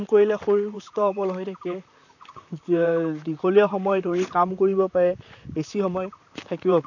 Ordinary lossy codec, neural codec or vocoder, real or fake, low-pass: none; vocoder, 44.1 kHz, 128 mel bands, Pupu-Vocoder; fake; 7.2 kHz